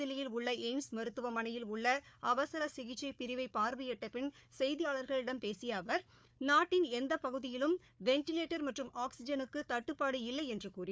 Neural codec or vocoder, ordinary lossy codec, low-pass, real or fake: codec, 16 kHz, 4 kbps, FunCodec, trained on Chinese and English, 50 frames a second; none; none; fake